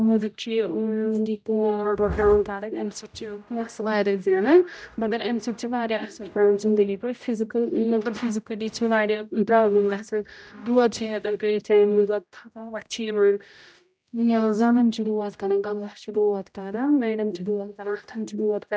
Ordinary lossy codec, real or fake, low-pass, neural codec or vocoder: none; fake; none; codec, 16 kHz, 0.5 kbps, X-Codec, HuBERT features, trained on general audio